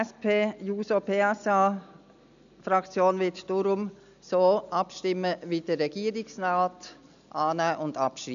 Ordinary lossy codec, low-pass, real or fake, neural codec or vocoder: none; 7.2 kHz; real; none